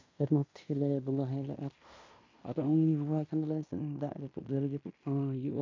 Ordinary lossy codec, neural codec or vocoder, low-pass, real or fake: MP3, 48 kbps; codec, 16 kHz in and 24 kHz out, 0.9 kbps, LongCat-Audio-Codec, fine tuned four codebook decoder; 7.2 kHz; fake